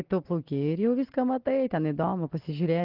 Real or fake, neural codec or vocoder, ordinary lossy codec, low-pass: real; none; Opus, 16 kbps; 5.4 kHz